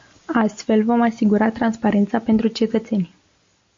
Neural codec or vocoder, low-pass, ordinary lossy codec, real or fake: none; 7.2 kHz; MP3, 48 kbps; real